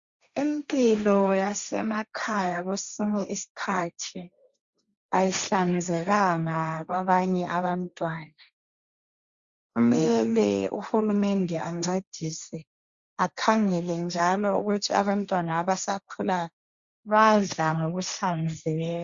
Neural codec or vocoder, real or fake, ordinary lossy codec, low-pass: codec, 16 kHz, 1.1 kbps, Voila-Tokenizer; fake; Opus, 64 kbps; 7.2 kHz